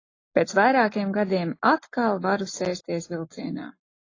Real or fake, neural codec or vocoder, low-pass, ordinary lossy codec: real; none; 7.2 kHz; AAC, 32 kbps